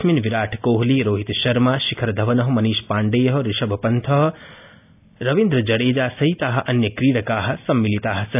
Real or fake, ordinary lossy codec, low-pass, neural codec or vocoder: real; AAC, 32 kbps; 3.6 kHz; none